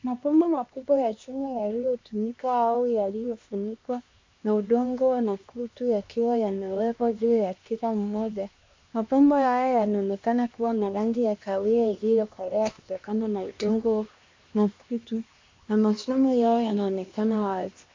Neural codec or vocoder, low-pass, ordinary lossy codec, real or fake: codec, 16 kHz, 2 kbps, X-Codec, HuBERT features, trained on LibriSpeech; 7.2 kHz; MP3, 48 kbps; fake